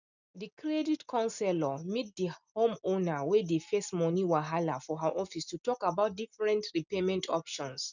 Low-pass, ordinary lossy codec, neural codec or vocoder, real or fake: 7.2 kHz; none; none; real